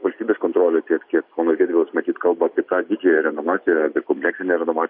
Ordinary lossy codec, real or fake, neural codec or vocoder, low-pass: MP3, 32 kbps; real; none; 5.4 kHz